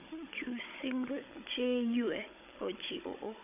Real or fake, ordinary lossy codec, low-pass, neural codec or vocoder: fake; none; 3.6 kHz; codec, 16 kHz, 16 kbps, FunCodec, trained on Chinese and English, 50 frames a second